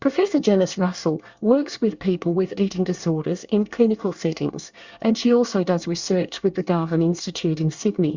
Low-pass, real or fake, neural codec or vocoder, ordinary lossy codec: 7.2 kHz; fake; codec, 24 kHz, 1 kbps, SNAC; Opus, 64 kbps